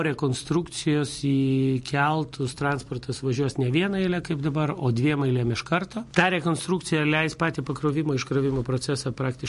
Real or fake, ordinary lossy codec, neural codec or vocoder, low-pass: fake; MP3, 48 kbps; vocoder, 44.1 kHz, 128 mel bands every 256 samples, BigVGAN v2; 14.4 kHz